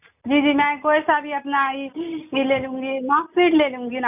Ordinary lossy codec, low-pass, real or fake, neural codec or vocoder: none; 3.6 kHz; real; none